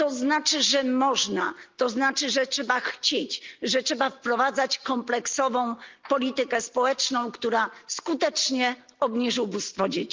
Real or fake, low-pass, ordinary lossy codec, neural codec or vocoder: real; 7.2 kHz; Opus, 32 kbps; none